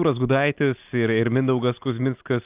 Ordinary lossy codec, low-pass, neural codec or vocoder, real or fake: Opus, 24 kbps; 3.6 kHz; none; real